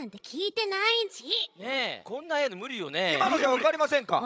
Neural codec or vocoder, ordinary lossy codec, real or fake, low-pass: codec, 16 kHz, 16 kbps, FreqCodec, larger model; none; fake; none